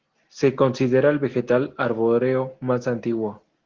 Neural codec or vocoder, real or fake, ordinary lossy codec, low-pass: none; real; Opus, 16 kbps; 7.2 kHz